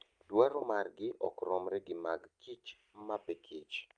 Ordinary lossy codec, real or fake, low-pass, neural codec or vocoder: Opus, 32 kbps; real; 9.9 kHz; none